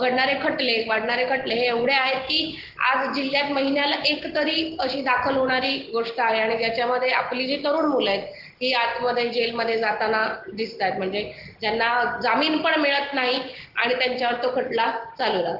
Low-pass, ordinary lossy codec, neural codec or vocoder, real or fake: 5.4 kHz; Opus, 16 kbps; none; real